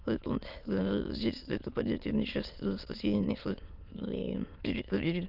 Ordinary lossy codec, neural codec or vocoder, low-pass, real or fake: Opus, 24 kbps; autoencoder, 22.05 kHz, a latent of 192 numbers a frame, VITS, trained on many speakers; 5.4 kHz; fake